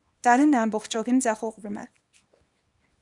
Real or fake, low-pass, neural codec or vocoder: fake; 10.8 kHz; codec, 24 kHz, 0.9 kbps, WavTokenizer, small release